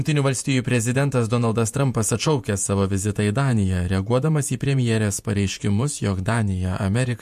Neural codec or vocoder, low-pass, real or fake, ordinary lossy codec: vocoder, 48 kHz, 128 mel bands, Vocos; 14.4 kHz; fake; MP3, 64 kbps